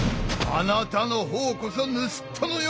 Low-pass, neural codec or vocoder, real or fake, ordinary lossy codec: none; none; real; none